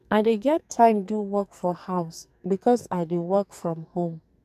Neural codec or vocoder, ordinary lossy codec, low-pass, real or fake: codec, 44.1 kHz, 2.6 kbps, SNAC; none; 14.4 kHz; fake